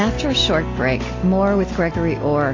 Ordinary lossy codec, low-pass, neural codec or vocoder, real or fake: AAC, 32 kbps; 7.2 kHz; none; real